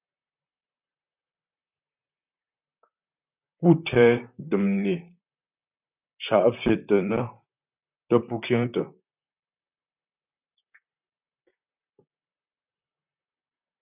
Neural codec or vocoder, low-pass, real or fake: vocoder, 44.1 kHz, 128 mel bands, Pupu-Vocoder; 3.6 kHz; fake